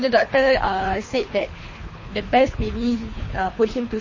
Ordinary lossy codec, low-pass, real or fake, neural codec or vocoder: MP3, 32 kbps; 7.2 kHz; fake; codec, 24 kHz, 3 kbps, HILCodec